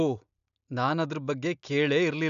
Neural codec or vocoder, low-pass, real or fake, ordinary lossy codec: none; 7.2 kHz; real; none